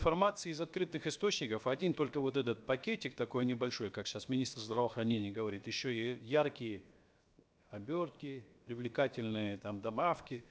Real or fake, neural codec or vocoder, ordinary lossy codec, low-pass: fake; codec, 16 kHz, 0.7 kbps, FocalCodec; none; none